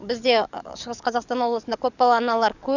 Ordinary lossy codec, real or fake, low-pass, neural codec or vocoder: none; fake; 7.2 kHz; codec, 44.1 kHz, 7.8 kbps, DAC